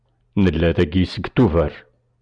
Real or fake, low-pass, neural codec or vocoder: real; 9.9 kHz; none